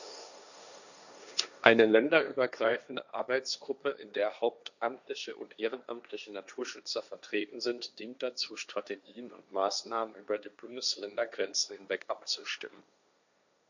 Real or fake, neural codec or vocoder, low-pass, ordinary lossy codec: fake; codec, 16 kHz, 1.1 kbps, Voila-Tokenizer; 7.2 kHz; none